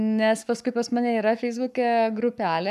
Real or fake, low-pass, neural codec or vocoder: fake; 14.4 kHz; codec, 44.1 kHz, 7.8 kbps, Pupu-Codec